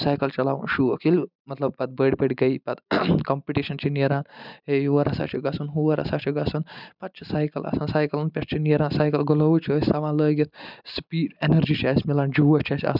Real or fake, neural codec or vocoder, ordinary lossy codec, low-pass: real; none; none; 5.4 kHz